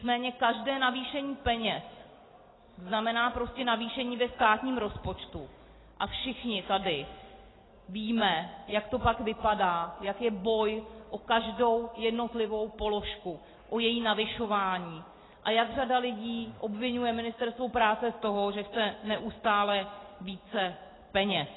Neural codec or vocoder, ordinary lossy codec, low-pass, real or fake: none; AAC, 16 kbps; 7.2 kHz; real